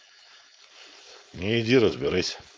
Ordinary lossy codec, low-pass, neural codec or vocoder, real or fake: none; none; codec, 16 kHz, 4.8 kbps, FACodec; fake